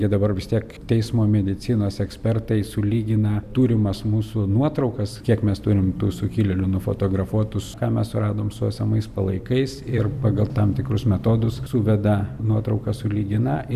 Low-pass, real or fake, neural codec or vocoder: 14.4 kHz; fake; vocoder, 44.1 kHz, 128 mel bands every 256 samples, BigVGAN v2